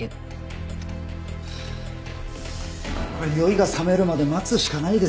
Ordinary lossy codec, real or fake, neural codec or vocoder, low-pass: none; real; none; none